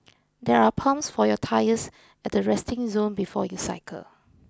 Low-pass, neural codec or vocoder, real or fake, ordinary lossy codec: none; none; real; none